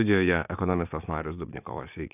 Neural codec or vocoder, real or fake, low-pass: vocoder, 22.05 kHz, 80 mel bands, Vocos; fake; 3.6 kHz